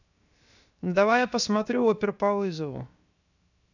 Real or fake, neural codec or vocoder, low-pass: fake; codec, 16 kHz, 0.7 kbps, FocalCodec; 7.2 kHz